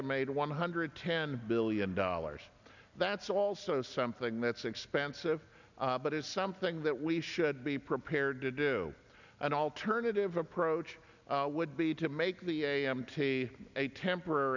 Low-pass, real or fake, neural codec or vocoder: 7.2 kHz; real; none